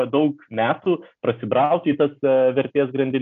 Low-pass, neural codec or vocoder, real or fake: 7.2 kHz; none; real